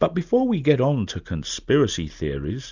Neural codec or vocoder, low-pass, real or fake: none; 7.2 kHz; real